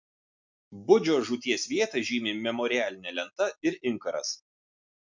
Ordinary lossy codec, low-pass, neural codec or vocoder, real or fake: MP3, 64 kbps; 7.2 kHz; none; real